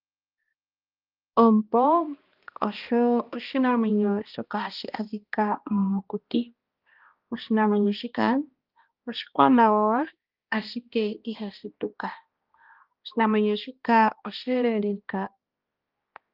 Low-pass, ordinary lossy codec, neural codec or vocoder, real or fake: 5.4 kHz; Opus, 32 kbps; codec, 16 kHz, 1 kbps, X-Codec, HuBERT features, trained on balanced general audio; fake